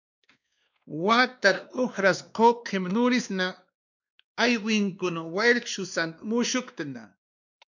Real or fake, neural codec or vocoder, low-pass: fake; codec, 16 kHz, 2 kbps, X-Codec, WavLM features, trained on Multilingual LibriSpeech; 7.2 kHz